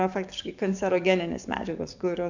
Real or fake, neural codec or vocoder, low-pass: fake; codec, 44.1 kHz, 7.8 kbps, DAC; 7.2 kHz